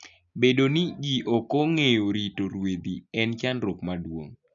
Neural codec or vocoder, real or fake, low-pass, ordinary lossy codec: none; real; 7.2 kHz; none